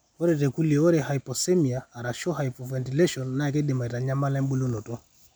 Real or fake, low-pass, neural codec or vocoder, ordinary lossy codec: real; none; none; none